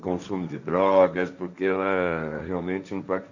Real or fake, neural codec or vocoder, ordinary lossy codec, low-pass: fake; codec, 16 kHz, 1.1 kbps, Voila-Tokenizer; none; 7.2 kHz